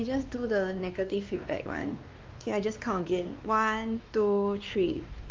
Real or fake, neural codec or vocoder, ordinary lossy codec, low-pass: fake; codec, 16 kHz, 2 kbps, X-Codec, WavLM features, trained on Multilingual LibriSpeech; Opus, 24 kbps; 7.2 kHz